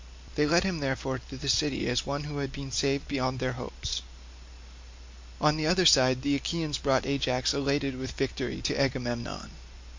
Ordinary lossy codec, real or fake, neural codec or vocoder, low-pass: MP3, 48 kbps; real; none; 7.2 kHz